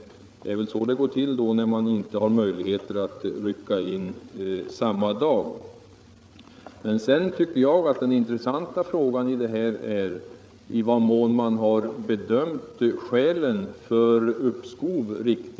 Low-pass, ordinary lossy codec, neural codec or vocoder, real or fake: none; none; codec, 16 kHz, 8 kbps, FreqCodec, larger model; fake